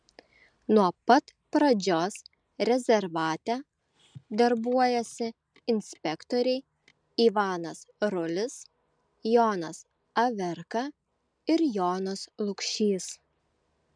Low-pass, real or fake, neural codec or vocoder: 9.9 kHz; real; none